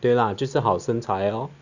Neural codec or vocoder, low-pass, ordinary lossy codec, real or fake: none; 7.2 kHz; none; real